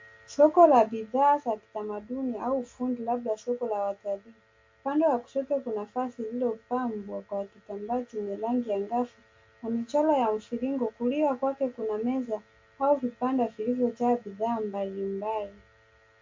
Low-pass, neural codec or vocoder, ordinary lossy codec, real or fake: 7.2 kHz; none; MP3, 48 kbps; real